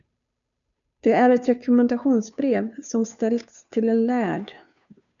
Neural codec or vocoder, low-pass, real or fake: codec, 16 kHz, 2 kbps, FunCodec, trained on Chinese and English, 25 frames a second; 7.2 kHz; fake